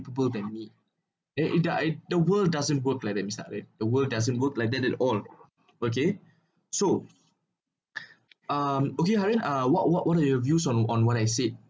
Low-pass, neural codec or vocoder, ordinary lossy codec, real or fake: none; none; none; real